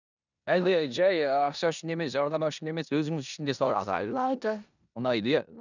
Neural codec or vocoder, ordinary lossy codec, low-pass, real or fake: codec, 16 kHz in and 24 kHz out, 0.9 kbps, LongCat-Audio-Codec, four codebook decoder; none; 7.2 kHz; fake